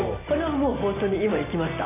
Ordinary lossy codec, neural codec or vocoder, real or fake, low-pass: none; none; real; 3.6 kHz